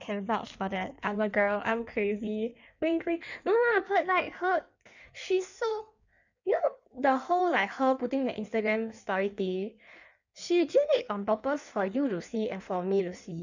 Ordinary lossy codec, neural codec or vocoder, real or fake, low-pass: none; codec, 16 kHz in and 24 kHz out, 1.1 kbps, FireRedTTS-2 codec; fake; 7.2 kHz